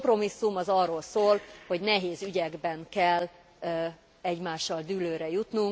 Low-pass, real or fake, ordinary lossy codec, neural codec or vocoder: none; real; none; none